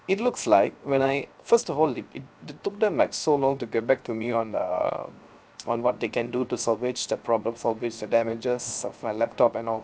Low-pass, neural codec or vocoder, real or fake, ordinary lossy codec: none; codec, 16 kHz, 0.7 kbps, FocalCodec; fake; none